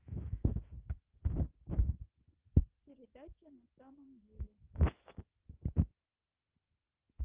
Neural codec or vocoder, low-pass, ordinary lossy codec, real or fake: autoencoder, 48 kHz, 128 numbers a frame, DAC-VAE, trained on Japanese speech; 3.6 kHz; Opus, 32 kbps; fake